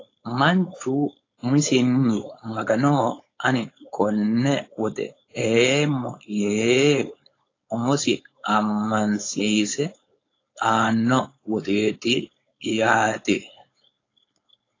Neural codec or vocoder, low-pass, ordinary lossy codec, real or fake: codec, 16 kHz, 4.8 kbps, FACodec; 7.2 kHz; AAC, 32 kbps; fake